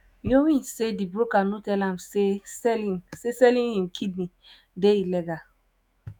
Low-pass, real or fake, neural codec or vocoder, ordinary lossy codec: none; fake; autoencoder, 48 kHz, 128 numbers a frame, DAC-VAE, trained on Japanese speech; none